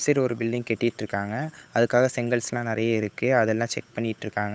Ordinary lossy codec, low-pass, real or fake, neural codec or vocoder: none; none; fake; codec, 16 kHz, 8 kbps, FunCodec, trained on Chinese and English, 25 frames a second